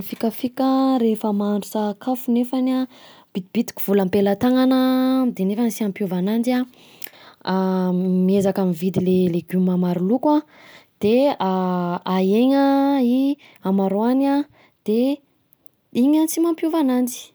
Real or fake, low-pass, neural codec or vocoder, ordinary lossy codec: real; none; none; none